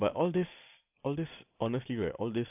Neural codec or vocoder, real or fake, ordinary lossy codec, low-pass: none; real; none; 3.6 kHz